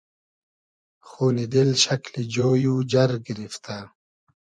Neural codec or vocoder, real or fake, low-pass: vocoder, 24 kHz, 100 mel bands, Vocos; fake; 9.9 kHz